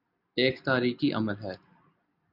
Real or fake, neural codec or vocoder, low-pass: real; none; 5.4 kHz